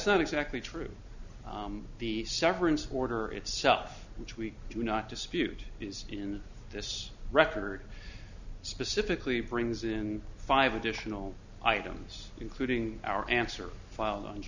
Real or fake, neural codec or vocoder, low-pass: real; none; 7.2 kHz